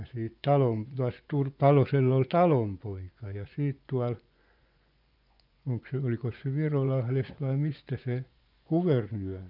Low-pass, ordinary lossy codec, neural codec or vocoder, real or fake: 5.4 kHz; none; vocoder, 24 kHz, 100 mel bands, Vocos; fake